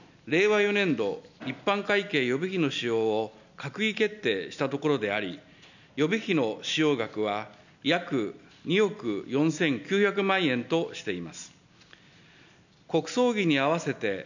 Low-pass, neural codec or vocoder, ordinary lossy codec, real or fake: 7.2 kHz; none; none; real